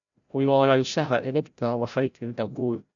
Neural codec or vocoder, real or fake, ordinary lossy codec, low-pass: codec, 16 kHz, 0.5 kbps, FreqCodec, larger model; fake; none; 7.2 kHz